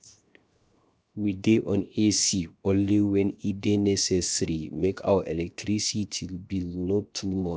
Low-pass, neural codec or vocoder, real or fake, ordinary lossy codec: none; codec, 16 kHz, 0.7 kbps, FocalCodec; fake; none